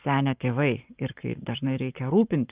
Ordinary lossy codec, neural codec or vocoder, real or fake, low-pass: Opus, 32 kbps; codec, 44.1 kHz, 7.8 kbps, Pupu-Codec; fake; 3.6 kHz